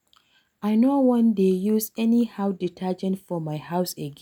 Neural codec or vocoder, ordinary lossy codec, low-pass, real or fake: none; none; 19.8 kHz; real